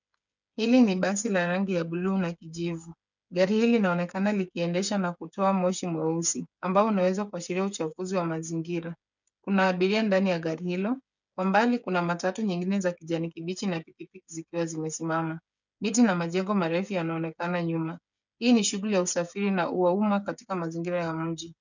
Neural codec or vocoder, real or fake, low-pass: codec, 16 kHz, 8 kbps, FreqCodec, smaller model; fake; 7.2 kHz